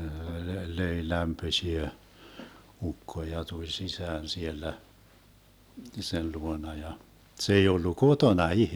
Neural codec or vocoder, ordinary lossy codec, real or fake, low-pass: none; none; real; none